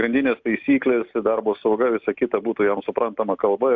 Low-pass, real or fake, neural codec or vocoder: 7.2 kHz; real; none